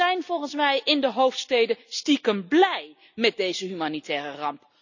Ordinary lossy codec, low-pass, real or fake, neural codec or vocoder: none; 7.2 kHz; real; none